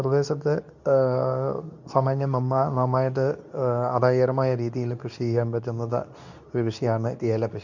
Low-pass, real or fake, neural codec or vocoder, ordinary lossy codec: 7.2 kHz; fake; codec, 24 kHz, 0.9 kbps, WavTokenizer, medium speech release version 2; none